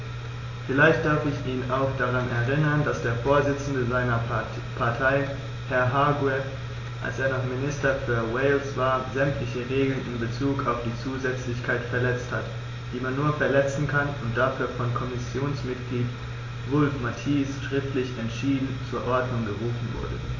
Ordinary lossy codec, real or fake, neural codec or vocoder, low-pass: AAC, 32 kbps; real; none; 7.2 kHz